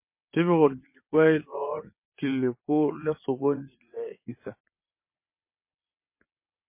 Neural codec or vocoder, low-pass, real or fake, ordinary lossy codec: vocoder, 22.05 kHz, 80 mel bands, WaveNeXt; 3.6 kHz; fake; MP3, 24 kbps